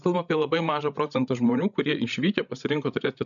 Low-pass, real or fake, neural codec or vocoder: 7.2 kHz; fake; codec, 16 kHz, 16 kbps, FreqCodec, larger model